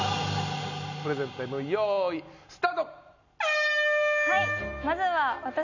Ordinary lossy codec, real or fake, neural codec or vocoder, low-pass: none; real; none; 7.2 kHz